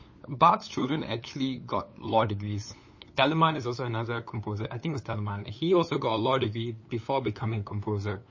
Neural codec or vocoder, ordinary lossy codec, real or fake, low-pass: codec, 16 kHz, 8 kbps, FunCodec, trained on LibriTTS, 25 frames a second; MP3, 32 kbps; fake; 7.2 kHz